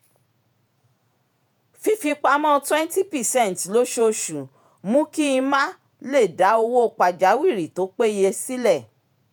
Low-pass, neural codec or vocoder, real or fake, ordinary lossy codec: none; vocoder, 48 kHz, 128 mel bands, Vocos; fake; none